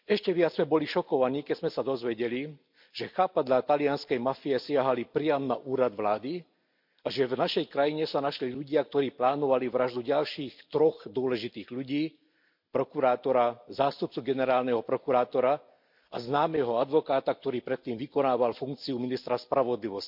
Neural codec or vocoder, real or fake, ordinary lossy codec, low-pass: none; real; MP3, 48 kbps; 5.4 kHz